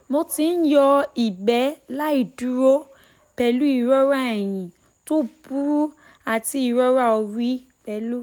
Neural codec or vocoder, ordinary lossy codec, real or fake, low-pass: none; none; real; none